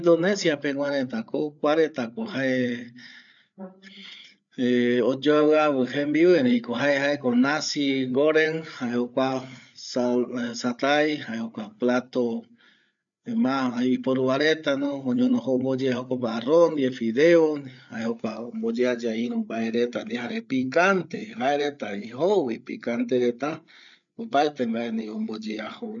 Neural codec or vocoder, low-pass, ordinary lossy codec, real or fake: codec, 16 kHz, 8 kbps, FreqCodec, larger model; 7.2 kHz; none; fake